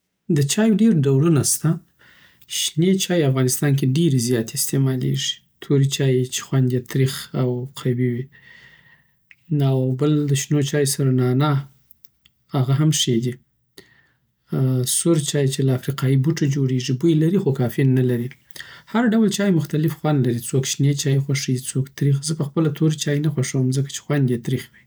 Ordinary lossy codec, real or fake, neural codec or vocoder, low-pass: none; real; none; none